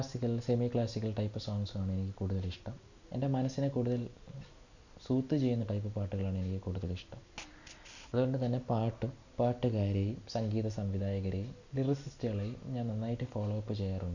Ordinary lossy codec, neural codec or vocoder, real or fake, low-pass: none; none; real; 7.2 kHz